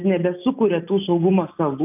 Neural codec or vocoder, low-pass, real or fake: none; 3.6 kHz; real